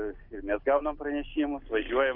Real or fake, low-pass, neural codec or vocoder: real; 5.4 kHz; none